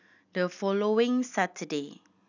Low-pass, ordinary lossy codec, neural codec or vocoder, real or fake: 7.2 kHz; none; none; real